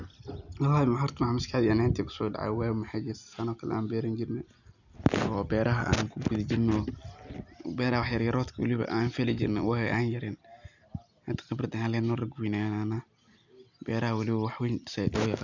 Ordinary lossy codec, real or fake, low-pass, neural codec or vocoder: none; real; 7.2 kHz; none